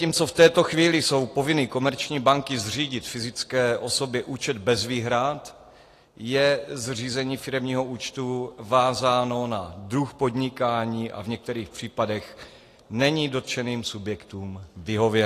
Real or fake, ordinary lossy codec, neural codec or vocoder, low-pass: real; AAC, 48 kbps; none; 14.4 kHz